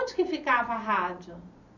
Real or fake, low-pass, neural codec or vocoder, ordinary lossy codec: fake; 7.2 kHz; vocoder, 44.1 kHz, 128 mel bands every 512 samples, BigVGAN v2; none